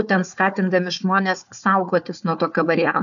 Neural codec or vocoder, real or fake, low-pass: codec, 16 kHz, 4 kbps, FunCodec, trained on Chinese and English, 50 frames a second; fake; 7.2 kHz